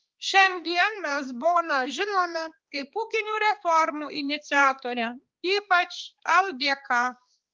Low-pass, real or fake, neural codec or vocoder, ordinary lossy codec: 7.2 kHz; fake; codec, 16 kHz, 2 kbps, X-Codec, HuBERT features, trained on balanced general audio; Opus, 24 kbps